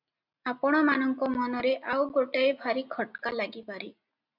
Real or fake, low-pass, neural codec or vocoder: real; 5.4 kHz; none